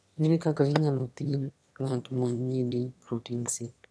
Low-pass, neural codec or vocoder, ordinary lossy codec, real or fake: none; autoencoder, 22.05 kHz, a latent of 192 numbers a frame, VITS, trained on one speaker; none; fake